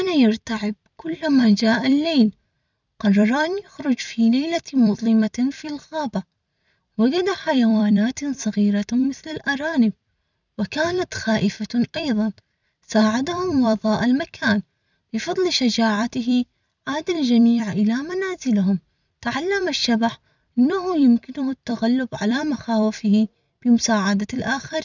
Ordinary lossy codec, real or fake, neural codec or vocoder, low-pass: none; fake; vocoder, 44.1 kHz, 128 mel bands every 256 samples, BigVGAN v2; 7.2 kHz